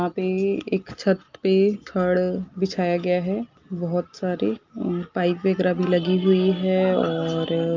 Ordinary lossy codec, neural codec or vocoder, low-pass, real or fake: Opus, 24 kbps; none; 7.2 kHz; real